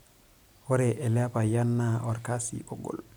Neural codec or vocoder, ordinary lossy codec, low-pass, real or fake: vocoder, 44.1 kHz, 128 mel bands every 256 samples, BigVGAN v2; none; none; fake